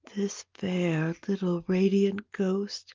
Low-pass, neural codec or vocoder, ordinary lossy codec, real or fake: 7.2 kHz; none; Opus, 32 kbps; real